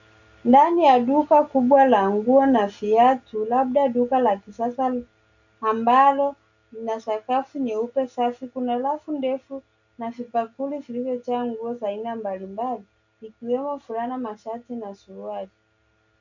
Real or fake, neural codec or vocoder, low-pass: real; none; 7.2 kHz